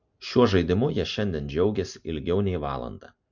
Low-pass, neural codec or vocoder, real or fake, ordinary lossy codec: 7.2 kHz; none; real; MP3, 48 kbps